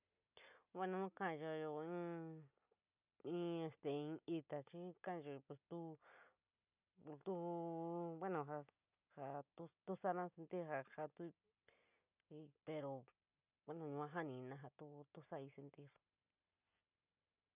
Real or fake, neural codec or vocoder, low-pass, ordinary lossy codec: real; none; 3.6 kHz; none